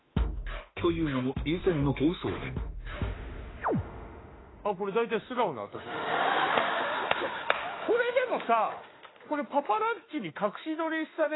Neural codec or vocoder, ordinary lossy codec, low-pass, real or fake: autoencoder, 48 kHz, 32 numbers a frame, DAC-VAE, trained on Japanese speech; AAC, 16 kbps; 7.2 kHz; fake